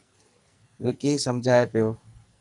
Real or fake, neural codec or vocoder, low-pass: fake; codec, 44.1 kHz, 2.6 kbps, SNAC; 10.8 kHz